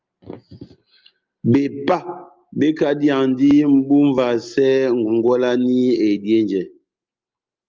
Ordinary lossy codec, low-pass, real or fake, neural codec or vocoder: Opus, 24 kbps; 7.2 kHz; real; none